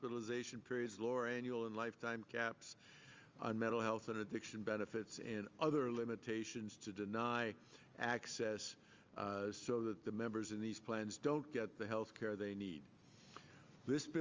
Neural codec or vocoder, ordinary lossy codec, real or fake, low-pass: none; Opus, 32 kbps; real; 7.2 kHz